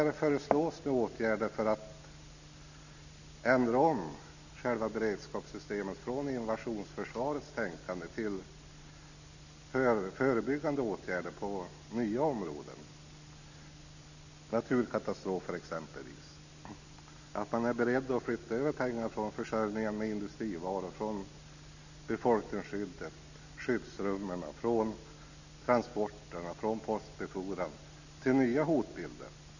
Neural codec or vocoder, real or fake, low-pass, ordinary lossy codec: none; real; 7.2 kHz; none